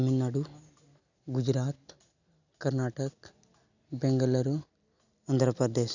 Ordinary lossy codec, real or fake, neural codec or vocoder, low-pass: none; real; none; 7.2 kHz